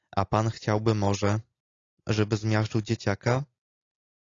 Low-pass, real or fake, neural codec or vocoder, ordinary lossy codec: 7.2 kHz; real; none; AAC, 32 kbps